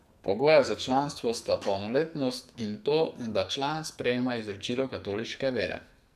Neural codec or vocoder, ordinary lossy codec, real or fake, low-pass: codec, 44.1 kHz, 2.6 kbps, SNAC; none; fake; 14.4 kHz